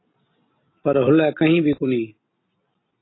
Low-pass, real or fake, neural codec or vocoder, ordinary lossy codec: 7.2 kHz; real; none; AAC, 16 kbps